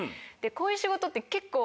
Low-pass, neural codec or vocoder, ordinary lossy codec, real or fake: none; none; none; real